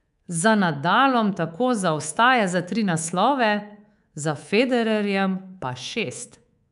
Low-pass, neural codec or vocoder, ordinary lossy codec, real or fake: 10.8 kHz; codec, 24 kHz, 3.1 kbps, DualCodec; none; fake